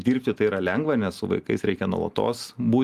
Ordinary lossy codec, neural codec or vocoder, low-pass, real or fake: Opus, 32 kbps; none; 14.4 kHz; real